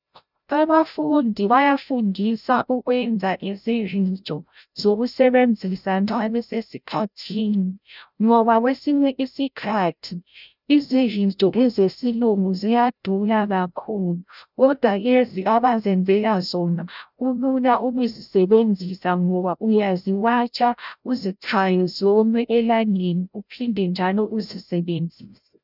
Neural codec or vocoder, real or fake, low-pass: codec, 16 kHz, 0.5 kbps, FreqCodec, larger model; fake; 5.4 kHz